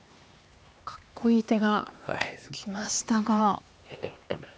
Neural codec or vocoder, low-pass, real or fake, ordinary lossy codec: codec, 16 kHz, 2 kbps, X-Codec, HuBERT features, trained on LibriSpeech; none; fake; none